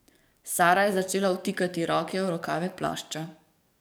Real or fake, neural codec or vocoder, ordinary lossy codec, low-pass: fake; codec, 44.1 kHz, 7.8 kbps, DAC; none; none